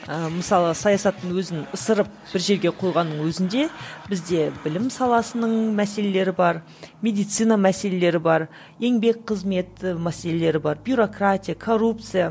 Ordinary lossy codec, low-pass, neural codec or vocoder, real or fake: none; none; none; real